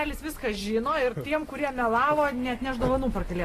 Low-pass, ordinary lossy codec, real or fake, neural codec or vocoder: 14.4 kHz; AAC, 48 kbps; real; none